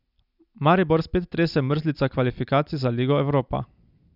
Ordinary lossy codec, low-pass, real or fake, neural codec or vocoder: none; 5.4 kHz; real; none